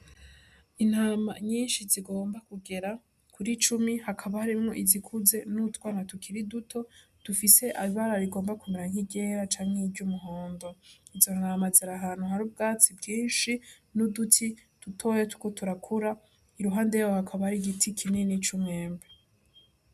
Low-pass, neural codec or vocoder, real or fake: 14.4 kHz; none; real